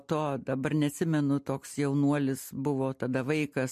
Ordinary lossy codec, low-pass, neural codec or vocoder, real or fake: MP3, 64 kbps; 14.4 kHz; none; real